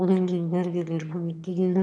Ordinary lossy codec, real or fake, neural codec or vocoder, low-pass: none; fake; autoencoder, 22.05 kHz, a latent of 192 numbers a frame, VITS, trained on one speaker; none